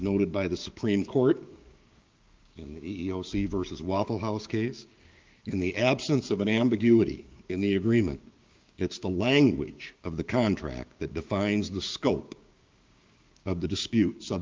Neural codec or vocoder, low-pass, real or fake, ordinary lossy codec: codec, 16 kHz, 6 kbps, DAC; 7.2 kHz; fake; Opus, 24 kbps